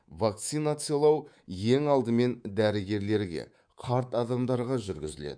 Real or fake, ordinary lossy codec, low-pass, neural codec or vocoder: fake; none; 9.9 kHz; codec, 24 kHz, 3.1 kbps, DualCodec